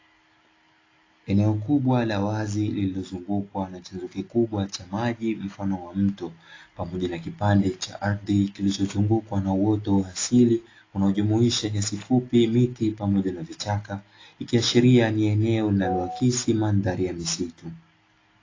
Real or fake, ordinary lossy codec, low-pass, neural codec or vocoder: real; AAC, 32 kbps; 7.2 kHz; none